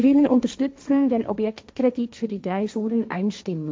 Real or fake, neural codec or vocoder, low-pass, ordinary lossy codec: fake; codec, 16 kHz, 1.1 kbps, Voila-Tokenizer; none; none